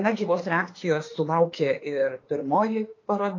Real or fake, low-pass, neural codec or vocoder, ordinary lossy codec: fake; 7.2 kHz; autoencoder, 48 kHz, 32 numbers a frame, DAC-VAE, trained on Japanese speech; AAC, 32 kbps